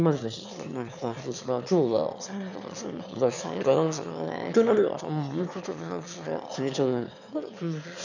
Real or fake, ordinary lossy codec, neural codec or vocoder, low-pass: fake; none; autoencoder, 22.05 kHz, a latent of 192 numbers a frame, VITS, trained on one speaker; 7.2 kHz